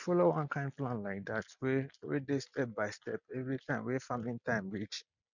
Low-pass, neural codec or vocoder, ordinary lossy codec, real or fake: 7.2 kHz; none; none; real